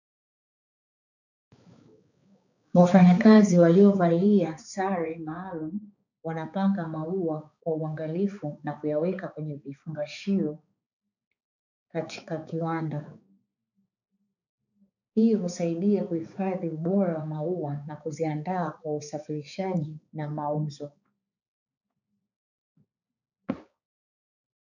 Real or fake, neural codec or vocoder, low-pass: fake; codec, 16 kHz, 4 kbps, X-Codec, HuBERT features, trained on balanced general audio; 7.2 kHz